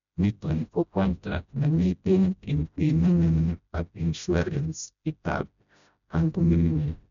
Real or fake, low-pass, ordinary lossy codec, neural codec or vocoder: fake; 7.2 kHz; none; codec, 16 kHz, 0.5 kbps, FreqCodec, smaller model